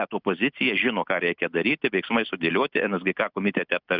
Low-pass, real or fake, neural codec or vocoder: 5.4 kHz; real; none